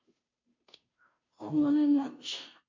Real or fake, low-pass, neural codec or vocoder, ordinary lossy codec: fake; 7.2 kHz; codec, 16 kHz, 0.5 kbps, FunCodec, trained on Chinese and English, 25 frames a second; MP3, 48 kbps